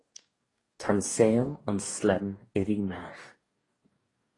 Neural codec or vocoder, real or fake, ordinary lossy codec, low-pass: codec, 44.1 kHz, 2.6 kbps, DAC; fake; AAC, 32 kbps; 10.8 kHz